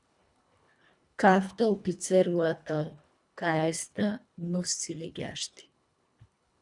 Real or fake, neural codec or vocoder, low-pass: fake; codec, 24 kHz, 1.5 kbps, HILCodec; 10.8 kHz